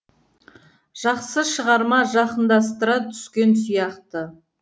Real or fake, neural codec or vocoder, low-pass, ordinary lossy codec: real; none; none; none